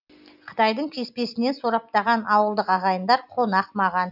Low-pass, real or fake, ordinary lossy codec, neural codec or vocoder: 5.4 kHz; real; none; none